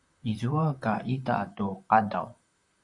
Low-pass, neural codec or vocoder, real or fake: 10.8 kHz; vocoder, 44.1 kHz, 128 mel bands, Pupu-Vocoder; fake